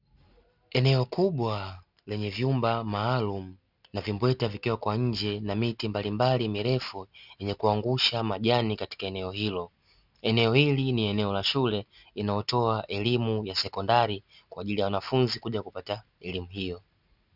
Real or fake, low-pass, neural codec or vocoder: real; 5.4 kHz; none